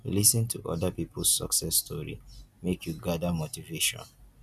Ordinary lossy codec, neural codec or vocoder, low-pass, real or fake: none; none; 14.4 kHz; real